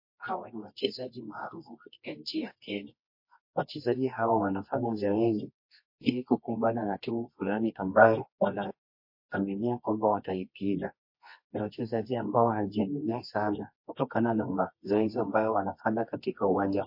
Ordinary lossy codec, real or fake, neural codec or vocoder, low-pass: MP3, 32 kbps; fake; codec, 24 kHz, 0.9 kbps, WavTokenizer, medium music audio release; 5.4 kHz